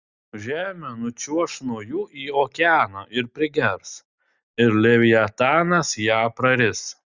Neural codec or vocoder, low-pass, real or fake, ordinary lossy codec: none; 7.2 kHz; real; Opus, 64 kbps